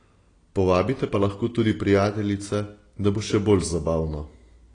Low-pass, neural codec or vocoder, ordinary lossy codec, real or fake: 9.9 kHz; none; AAC, 32 kbps; real